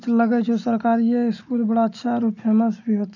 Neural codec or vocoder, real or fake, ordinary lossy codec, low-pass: none; real; none; 7.2 kHz